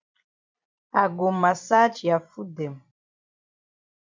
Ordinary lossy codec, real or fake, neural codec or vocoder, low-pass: MP3, 64 kbps; fake; vocoder, 44.1 kHz, 128 mel bands every 256 samples, BigVGAN v2; 7.2 kHz